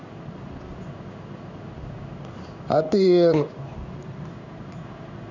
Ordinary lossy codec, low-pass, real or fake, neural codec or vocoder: none; 7.2 kHz; fake; codec, 16 kHz in and 24 kHz out, 1 kbps, XY-Tokenizer